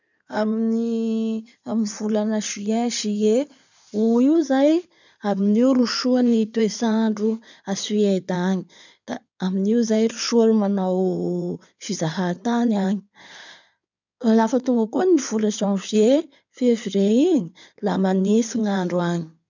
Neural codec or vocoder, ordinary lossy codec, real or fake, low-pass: codec, 16 kHz in and 24 kHz out, 2.2 kbps, FireRedTTS-2 codec; none; fake; 7.2 kHz